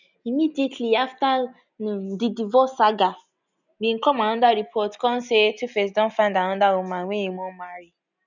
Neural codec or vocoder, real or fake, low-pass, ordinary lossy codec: none; real; 7.2 kHz; none